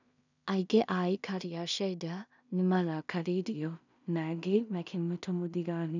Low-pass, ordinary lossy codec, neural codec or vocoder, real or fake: 7.2 kHz; none; codec, 16 kHz in and 24 kHz out, 0.4 kbps, LongCat-Audio-Codec, two codebook decoder; fake